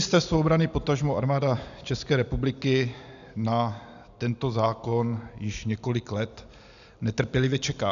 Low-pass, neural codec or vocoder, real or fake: 7.2 kHz; none; real